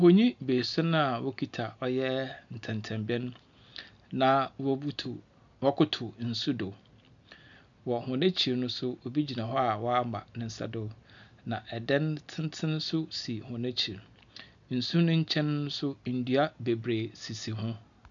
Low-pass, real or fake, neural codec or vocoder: 7.2 kHz; real; none